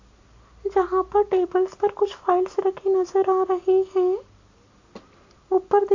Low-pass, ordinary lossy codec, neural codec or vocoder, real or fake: 7.2 kHz; AAC, 48 kbps; none; real